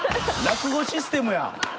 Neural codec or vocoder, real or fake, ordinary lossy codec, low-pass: none; real; none; none